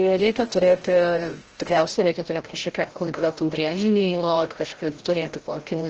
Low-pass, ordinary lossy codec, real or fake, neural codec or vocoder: 7.2 kHz; Opus, 16 kbps; fake; codec, 16 kHz, 0.5 kbps, FreqCodec, larger model